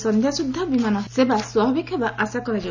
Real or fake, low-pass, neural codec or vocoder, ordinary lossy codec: real; 7.2 kHz; none; none